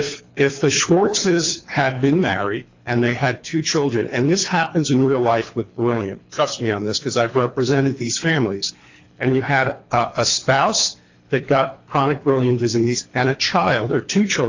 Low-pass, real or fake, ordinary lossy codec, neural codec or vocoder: 7.2 kHz; fake; AAC, 48 kbps; codec, 24 kHz, 3 kbps, HILCodec